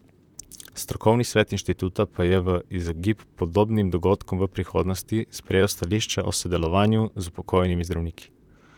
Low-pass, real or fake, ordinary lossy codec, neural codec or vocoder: 19.8 kHz; fake; none; vocoder, 44.1 kHz, 128 mel bands, Pupu-Vocoder